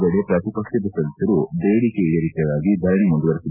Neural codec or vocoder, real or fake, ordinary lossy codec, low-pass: none; real; none; 3.6 kHz